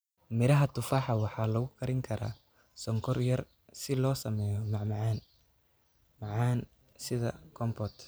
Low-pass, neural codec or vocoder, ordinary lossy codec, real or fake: none; none; none; real